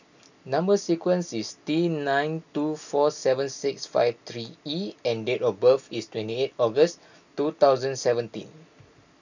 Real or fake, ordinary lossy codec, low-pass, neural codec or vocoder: real; none; 7.2 kHz; none